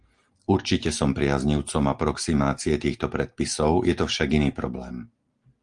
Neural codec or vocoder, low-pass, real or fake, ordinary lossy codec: none; 9.9 kHz; real; Opus, 24 kbps